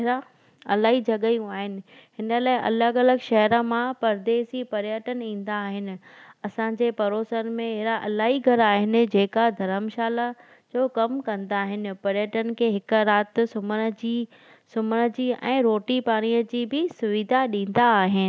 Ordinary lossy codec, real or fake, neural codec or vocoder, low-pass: none; real; none; none